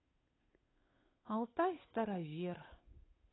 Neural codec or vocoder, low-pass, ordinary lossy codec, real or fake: codec, 16 kHz, 8 kbps, FunCodec, trained on Chinese and English, 25 frames a second; 7.2 kHz; AAC, 16 kbps; fake